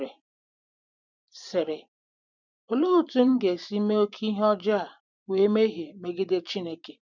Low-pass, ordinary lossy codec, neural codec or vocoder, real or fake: 7.2 kHz; none; vocoder, 44.1 kHz, 128 mel bands every 512 samples, BigVGAN v2; fake